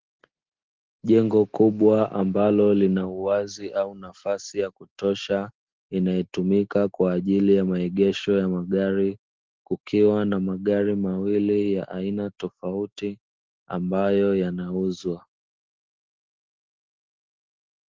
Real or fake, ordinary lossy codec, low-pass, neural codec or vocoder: real; Opus, 16 kbps; 7.2 kHz; none